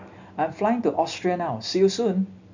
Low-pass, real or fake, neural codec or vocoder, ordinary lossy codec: 7.2 kHz; real; none; none